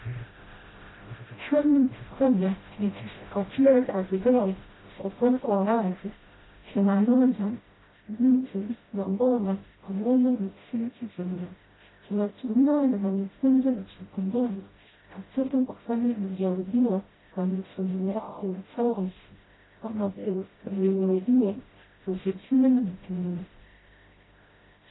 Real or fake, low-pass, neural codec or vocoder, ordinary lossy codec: fake; 7.2 kHz; codec, 16 kHz, 0.5 kbps, FreqCodec, smaller model; AAC, 16 kbps